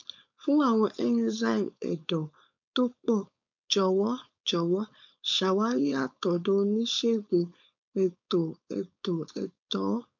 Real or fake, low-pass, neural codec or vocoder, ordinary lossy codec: fake; 7.2 kHz; codec, 16 kHz, 4.8 kbps, FACodec; MP3, 64 kbps